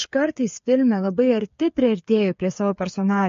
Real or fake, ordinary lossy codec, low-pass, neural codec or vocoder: fake; MP3, 48 kbps; 7.2 kHz; codec, 16 kHz, 8 kbps, FreqCodec, smaller model